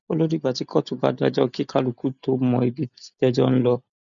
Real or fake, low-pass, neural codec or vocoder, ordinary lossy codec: real; 7.2 kHz; none; none